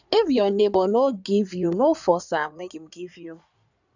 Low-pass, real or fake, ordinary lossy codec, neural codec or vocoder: 7.2 kHz; fake; none; codec, 16 kHz in and 24 kHz out, 2.2 kbps, FireRedTTS-2 codec